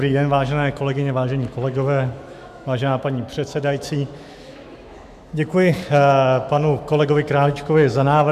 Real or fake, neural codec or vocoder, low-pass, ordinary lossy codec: fake; autoencoder, 48 kHz, 128 numbers a frame, DAC-VAE, trained on Japanese speech; 14.4 kHz; MP3, 96 kbps